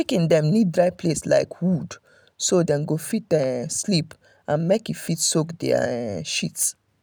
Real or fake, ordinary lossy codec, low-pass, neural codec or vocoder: real; none; none; none